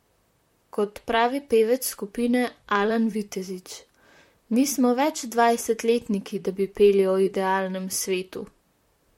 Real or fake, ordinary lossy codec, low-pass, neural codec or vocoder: fake; MP3, 64 kbps; 19.8 kHz; vocoder, 44.1 kHz, 128 mel bands, Pupu-Vocoder